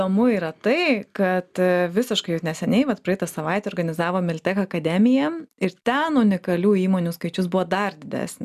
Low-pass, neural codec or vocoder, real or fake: 14.4 kHz; none; real